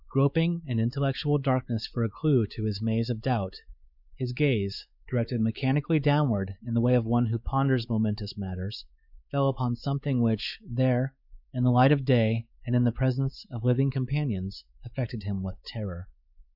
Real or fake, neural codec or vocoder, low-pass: fake; codec, 16 kHz, 4 kbps, X-Codec, WavLM features, trained on Multilingual LibriSpeech; 5.4 kHz